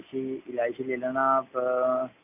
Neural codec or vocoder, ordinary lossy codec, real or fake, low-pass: none; AAC, 32 kbps; real; 3.6 kHz